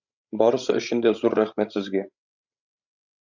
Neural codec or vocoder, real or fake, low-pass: codec, 16 kHz, 16 kbps, FreqCodec, larger model; fake; 7.2 kHz